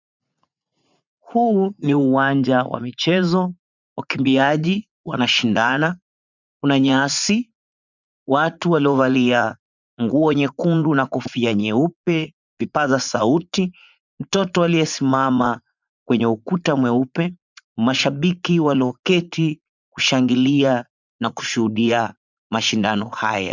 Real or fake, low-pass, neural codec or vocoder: fake; 7.2 kHz; vocoder, 44.1 kHz, 80 mel bands, Vocos